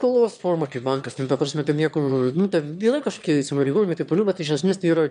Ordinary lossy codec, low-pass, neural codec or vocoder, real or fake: MP3, 96 kbps; 9.9 kHz; autoencoder, 22.05 kHz, a latent of 192 numbers a frame, VITS, trained on one speaker; fake